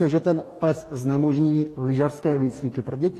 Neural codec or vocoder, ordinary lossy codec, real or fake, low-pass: codec, 44.1 kHz, 2.6 kbps, DAC; AAC, 48 kbps; fake; 14.4 kHz